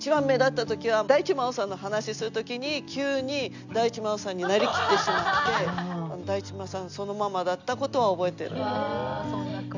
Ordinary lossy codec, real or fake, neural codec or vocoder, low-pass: none; real; none; 7.2 kHz